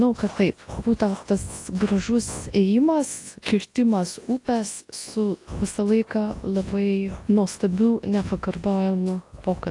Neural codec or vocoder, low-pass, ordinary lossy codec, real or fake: codec, 24 kHz, 0.9 kbps, WavTokenizer, large speech release; 10.8 kHz; AAC, 48 kbps; fake